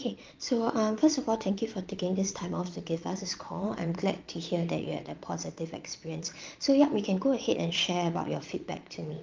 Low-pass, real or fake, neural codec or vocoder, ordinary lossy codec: 7.2 kHz; fake; vocoder, 22.05 kHz, 80 mel bands, Vocos; Opus, 32 kbps